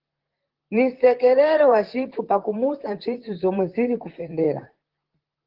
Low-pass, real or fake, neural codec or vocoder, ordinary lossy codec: 5.4 kHz; fake; vocoder, 44.1 kHz, 128 mel bands, Pupu-Vocoder; Opus, 16 kbps